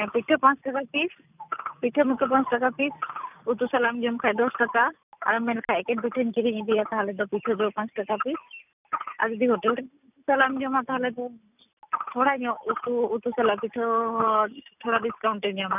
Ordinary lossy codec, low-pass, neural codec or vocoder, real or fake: none; 3.6 kHz; none; real